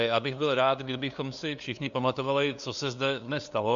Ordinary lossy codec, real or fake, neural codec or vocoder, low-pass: Opus, 64 kbps; fake; codec, 16 kHz, 2 kbps, FunCodec, trained on LibriTTS, 25 frames a second; 7.2 kHz